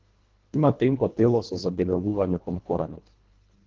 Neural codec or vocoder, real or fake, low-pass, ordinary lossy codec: codec, 24 kHz, 1.5 kbps, HILCodec; fake; 7.2 kHz; Opus, 16 kbps